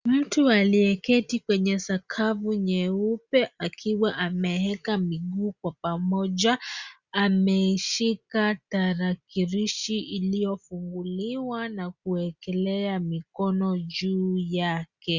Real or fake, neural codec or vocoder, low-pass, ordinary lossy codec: real; none; 7.2 kHz; Opus, 64 kbps